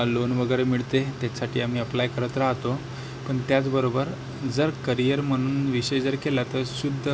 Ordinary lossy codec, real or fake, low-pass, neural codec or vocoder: none; real; none; none